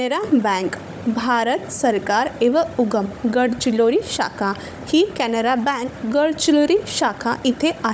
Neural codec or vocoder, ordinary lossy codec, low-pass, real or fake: codec, 16 kHz, 16 kbps, FunCodec, trained on Chinese and English, 50 frames a second; none; none; fake